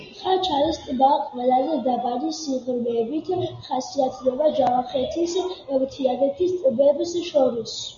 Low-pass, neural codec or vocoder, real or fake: 7.2 kHz; none; real